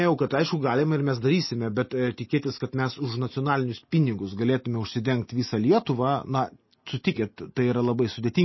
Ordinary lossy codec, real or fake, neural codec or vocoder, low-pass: MP3, 24 kbps; real; none; 7.2 kHz